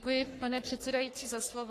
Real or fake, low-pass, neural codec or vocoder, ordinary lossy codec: fake; 14.4 kHz; codec, 44.1 kHz, 3.4 kbps, Pupu-Codec; AAC, 48 kbps